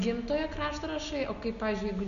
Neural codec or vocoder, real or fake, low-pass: none; real; 7.2 kHz